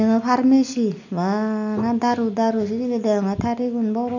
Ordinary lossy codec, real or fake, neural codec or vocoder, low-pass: none; real; none; 7.2 kHz